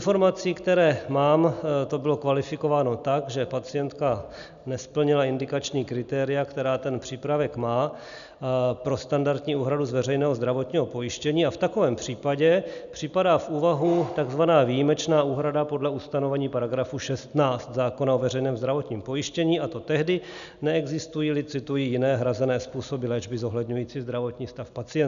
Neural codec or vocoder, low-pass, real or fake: none; 7.2 kHz; real